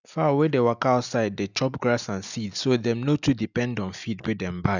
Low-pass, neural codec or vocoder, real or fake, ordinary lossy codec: 7.2 kHz; none; real; none